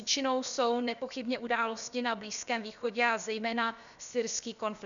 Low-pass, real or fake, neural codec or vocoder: 7.2 kHz; fake; codec, 16 kHz, about 1 kbps, DyCAST, with the encoder's durations